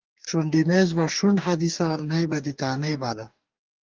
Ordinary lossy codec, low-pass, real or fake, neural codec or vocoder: Opus, 24 kbps; 7.2 kHz; fake; codec, 44.1 kHz, 2.6 kbps, DAC